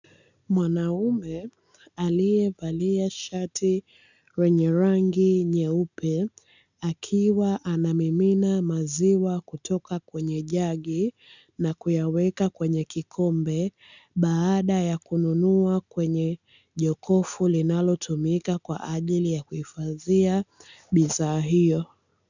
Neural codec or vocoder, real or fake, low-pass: none; real; 7.2 kHz